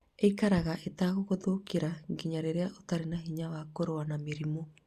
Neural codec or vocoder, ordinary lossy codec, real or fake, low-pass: none; Opus, 64 kbps; real; 14.4 kHz